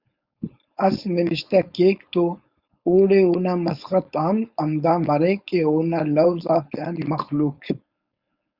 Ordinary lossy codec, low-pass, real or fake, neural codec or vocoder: Opus, 64 kbps; 5.4 kHz; fake; codec, 16 kHz, 4.8 kbps, FACodec